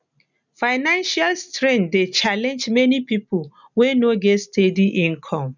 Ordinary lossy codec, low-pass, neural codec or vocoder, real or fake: none; 7.2 kHz; none; real